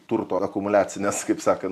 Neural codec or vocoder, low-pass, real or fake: none; 14.4 kHz; real